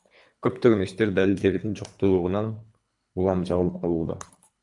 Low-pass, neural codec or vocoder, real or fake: 10.8 kHz; codec, 24 kHz, 3 kbps, HILCodec; fake